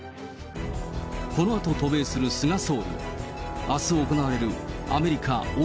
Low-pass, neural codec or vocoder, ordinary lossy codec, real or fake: none; none; none; real